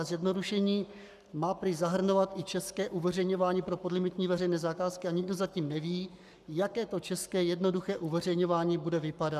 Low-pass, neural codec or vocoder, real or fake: 14.4 kHz; codec, 44.1 kHz, 7.8 kbps, Pupu-Codec; fake